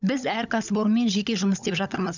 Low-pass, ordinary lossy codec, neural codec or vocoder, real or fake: 7.2 kHz; none; codec, 16 kHz, 16 kbps, FunCodec, trained on LibriTTS, 50 frames a second; fake